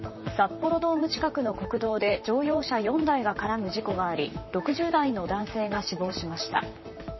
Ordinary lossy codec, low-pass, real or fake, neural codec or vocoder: MP3, 24 kbps; 7.2 kHz; fake; vocoder, 44.1 kHz, 128 mel bands, Pupu-Vocoder